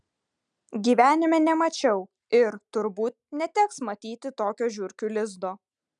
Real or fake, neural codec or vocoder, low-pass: real; none; 9.9 kHz